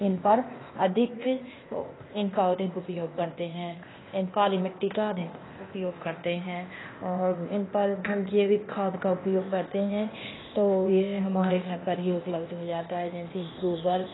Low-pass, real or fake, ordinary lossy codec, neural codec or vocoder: 7.2 kHz; fake; AAC, 16 kbps; codec, 16 kHz, 0.8 kbps, ZipCodec